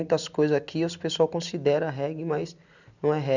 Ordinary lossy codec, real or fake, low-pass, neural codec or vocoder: none; real; 7.2 kHz; none